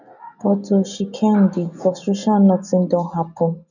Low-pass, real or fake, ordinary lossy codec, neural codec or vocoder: 7.2 kHz; real; none; none